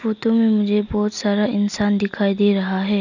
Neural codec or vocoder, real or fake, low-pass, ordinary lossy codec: none; real; 7.2 kHz; none